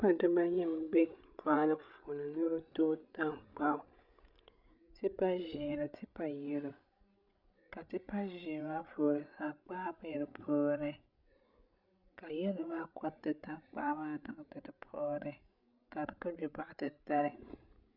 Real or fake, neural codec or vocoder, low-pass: fake; codec, 16 kHz, 16 kbps, FreqCodec, larger model; 5.4 kHz